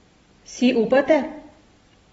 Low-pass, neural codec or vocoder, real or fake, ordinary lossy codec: 19.8 kHz; vocoder, 44.1 kHz, 128 mel bands every 256 samples, BigVGAN v2; fake; AAC, 24 kbps